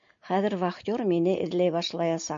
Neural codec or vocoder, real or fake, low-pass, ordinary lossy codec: none; real; 7.2 kHz; MP3, 48 kbps